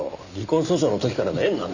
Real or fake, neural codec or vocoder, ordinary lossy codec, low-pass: real; none; none; 7.2 kHz